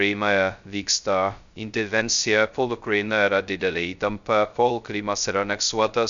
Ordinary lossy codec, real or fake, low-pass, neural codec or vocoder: Opus, 64 kbps; fake; 7.2 kHz; codec, 16 kHz, 0.2 kbps, FocalCodec